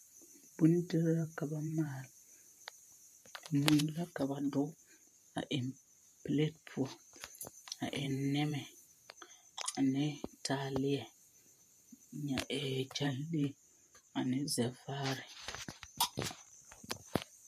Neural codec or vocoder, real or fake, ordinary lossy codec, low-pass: vocoder, 44.1 kHz, 128 mel bands every 512 samples, BigVGAN v2; fake; MP3, 64 kbps; 14.4 kHz